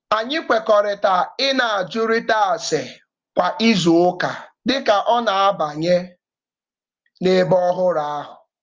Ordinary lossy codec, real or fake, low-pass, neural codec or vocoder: Opus, 32 kbps; real; 7.2 kHz; none